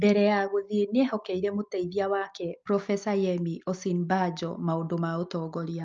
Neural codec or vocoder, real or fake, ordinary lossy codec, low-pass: none; real; Opus, 24 kbps; 7.2 kHz